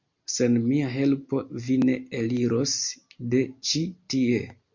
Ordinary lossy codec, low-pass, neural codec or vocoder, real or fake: MP3, 48 kbps; 7.2 kHz; none; real